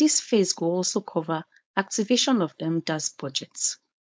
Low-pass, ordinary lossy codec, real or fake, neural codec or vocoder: none; none; fake; codec, 16 kHz, 4.8 kbps, FACodec